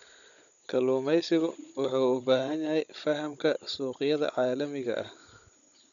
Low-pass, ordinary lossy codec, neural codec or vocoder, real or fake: 7.2 kHz; none; codec, 16 kHz, 16 kbps, FunCodec, trained on Chinese and English, 50 frames a second; fake